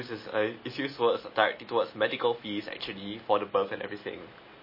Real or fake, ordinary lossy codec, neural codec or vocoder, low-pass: real; MP3, 24 kbps; none; 5.4 kHz